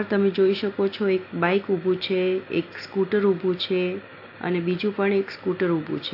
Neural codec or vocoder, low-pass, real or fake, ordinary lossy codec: none; 5.4 kHz; real; AAC, 32 kbps